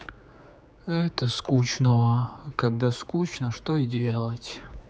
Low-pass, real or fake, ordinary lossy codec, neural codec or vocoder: none; fake; none; codec, 16 kHz, 4 kbps, X-Codec, HuBERT features, trained on general audio